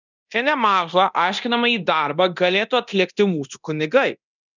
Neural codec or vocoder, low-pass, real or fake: codec, 24 kHz, 0.9 kbps, DualCodec; 7.2 kHz; fake